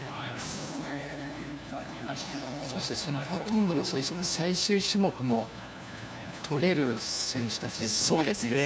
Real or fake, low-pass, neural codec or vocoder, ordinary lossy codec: fake; none; codec, 16 kHz, 1 kbps, FunCodec, trained on LibriTTS, 50 frames a second; none